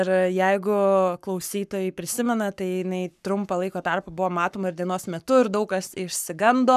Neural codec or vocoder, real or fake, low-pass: codec, 44.1 kHz, 7.8 kbps, Pupu-Codec; fake; 14.4 kHz